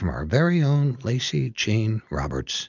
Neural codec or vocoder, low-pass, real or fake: none; 7.2 kHz; real